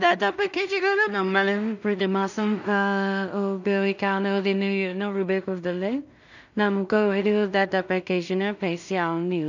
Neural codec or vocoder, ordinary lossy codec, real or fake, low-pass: codec, 16 kHz in and 24 kHz out, 0.4 kbps, LongCat-Audio-Codec, two codebook decoder; none; fake; 7.2 kHz